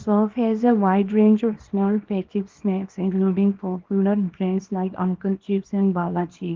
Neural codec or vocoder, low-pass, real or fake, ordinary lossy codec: codec, 24 kHz, 0.9 kbps, WavTokenizer, small release; 7.2 kHz; fake; Opus, 16 kbps